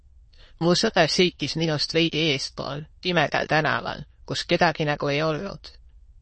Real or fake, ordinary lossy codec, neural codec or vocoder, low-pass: fake; MP3, 32 kbps; autoencoder, 22.05 kHz, a latent of 192 numbers a frame, VITS, trained on many speakers; 9.9 kHz